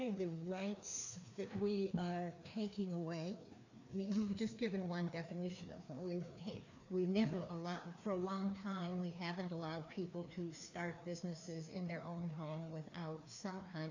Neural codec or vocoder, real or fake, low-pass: codec, 16 kHz, 2 kbps, FreqCodec, larger model; fake; 7.2 kHz